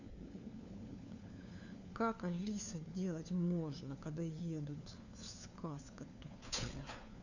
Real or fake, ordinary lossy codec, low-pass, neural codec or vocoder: fake; none; 7.2 kHz; codec, 16 kHz, 4 kbps, FunCodec, trained on LibriTTS, 50 frames a second